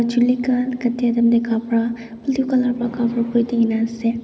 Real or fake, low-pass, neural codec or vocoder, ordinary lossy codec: real; none; none; none